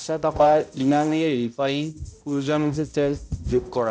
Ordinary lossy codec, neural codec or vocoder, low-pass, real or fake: none; codec, 16 kHz, 0.5 kbps, X-Codec, HuBERT features, trained on balanced general audio; none; fake